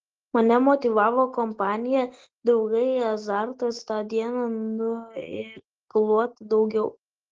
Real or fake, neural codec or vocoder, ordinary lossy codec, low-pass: real; none; Opus, 16 kbps; 7.2 kHz